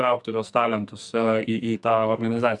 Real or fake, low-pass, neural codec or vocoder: fake; 10.8 kHz; codec, 44.1 kHz, 2.6 kbps, SNAC